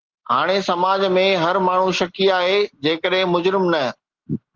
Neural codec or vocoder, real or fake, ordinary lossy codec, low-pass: none; real; Opus, 16 kbps; 7.2 kHz